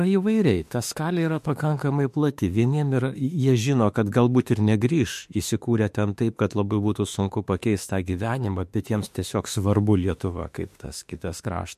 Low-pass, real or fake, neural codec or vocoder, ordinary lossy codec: 14.4 kHz; fake; autoencoder, 48 kHz, 32 numbers a frame, DAC-VAE, trained on Japanese speech; MP3, 64 kbps